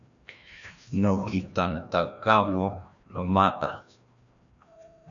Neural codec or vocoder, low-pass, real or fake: codec, 16 kHz, 1 kbps, FreqCodec, larger model; 7.2 kHz; fake